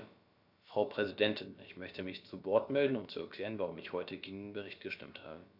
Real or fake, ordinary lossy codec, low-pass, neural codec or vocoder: fake; none; 5.4 kHz; codec, 16 kHz, about 1 kbps, DyCAST, with the encoder's durations